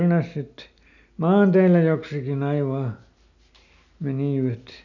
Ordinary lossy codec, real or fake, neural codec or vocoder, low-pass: none; real; none; 7.2 kHz